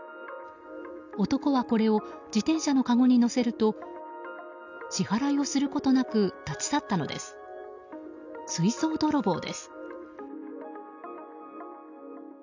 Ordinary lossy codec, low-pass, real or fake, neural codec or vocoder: none; 7.2 kHz; real; none